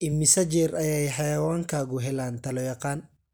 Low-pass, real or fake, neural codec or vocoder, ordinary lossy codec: none; real; none; none